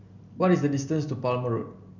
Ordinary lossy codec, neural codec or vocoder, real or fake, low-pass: none; none; real; 7.2 kHz